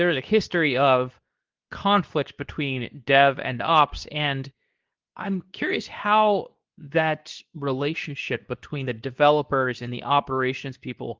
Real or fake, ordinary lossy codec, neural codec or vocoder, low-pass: fake; Opus, 32 kbps; codec, 24 kHz, 0.9 kbps, WavTokenizer, medium speech release version 2; 7.2 kHz